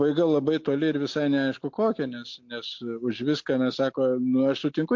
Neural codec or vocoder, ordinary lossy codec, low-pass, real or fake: none; MP3, 48 kbps; 7.2 kHz; real